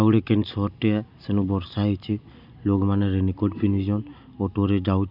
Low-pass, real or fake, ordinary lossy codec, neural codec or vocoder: 5.4 kHz; real; none; none